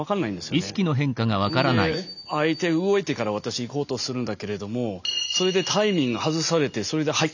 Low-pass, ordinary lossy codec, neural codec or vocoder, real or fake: 7.2 kHz; none; none; real